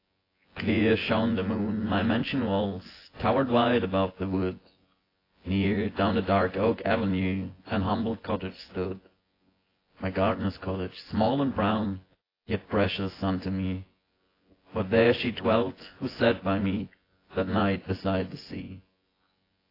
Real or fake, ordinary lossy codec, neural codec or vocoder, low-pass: fake; AAC, 24 kbps; vocoder, 24 kHz, 100 mel bands, Vocos; 5.4 kHz